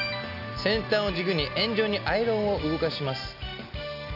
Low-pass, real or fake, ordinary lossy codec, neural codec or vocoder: 5.4 kHz; real; none; none